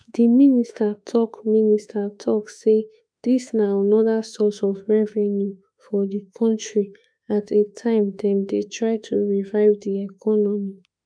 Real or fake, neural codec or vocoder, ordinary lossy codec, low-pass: fake; autoencoder, 48 kHz, 32 numbers a frame, DAC-VAE, trained on Japanese speech; none; 9.9 kHz